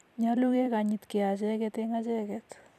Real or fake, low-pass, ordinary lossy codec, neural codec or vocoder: fake; 14.4 kHz; none; vocoder, 44.1 kHz, 128 mel bands every 256 samples, BigVGAN v2